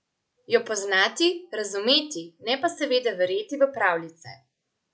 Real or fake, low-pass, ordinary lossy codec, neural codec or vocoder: real; none; none; none